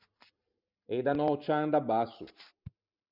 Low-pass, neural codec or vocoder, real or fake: 5.4 kHz; vocoder, 44.1 kHz, 128 mel bands every 512 samples, BigVGAN v2; fake